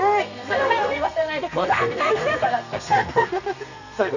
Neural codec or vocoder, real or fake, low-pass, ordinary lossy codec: codec, 32 kHz, 1.9 kbps, SNAC; fake; 7.2 kHz; none